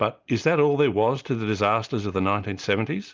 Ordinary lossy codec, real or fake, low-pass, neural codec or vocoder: Opus, 24 kbps; real; 7.2 kHz; none